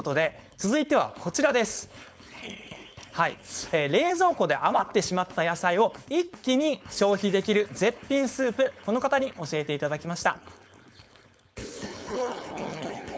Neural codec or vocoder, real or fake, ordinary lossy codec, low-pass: codec, 16 kHz, 4.8 kbps, FACodec; fake; none; none